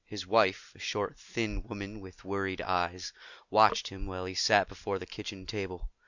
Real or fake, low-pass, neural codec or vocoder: real; 7.2 kHz; none